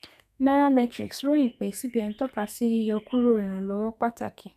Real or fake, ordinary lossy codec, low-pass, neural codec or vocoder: fake; none; 14.4 kHz; codec, 32 kHz, 1.9 kbps, SNAC